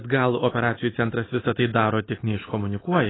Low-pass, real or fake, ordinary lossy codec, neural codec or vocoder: 7.2 kHz; real; AAC, 16 kbps; none